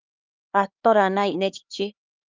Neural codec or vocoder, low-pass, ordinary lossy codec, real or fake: codec, 16 kHz, 4 kbps, X-Codec, HuBERT features, trained on LibriSpeech; 7.2 kHz; Opus, 32 kbps; fake